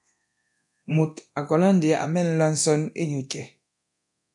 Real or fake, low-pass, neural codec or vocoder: fake; 10.8 kHz; codec, 24 kHz, 0.9 kbps, DualCodec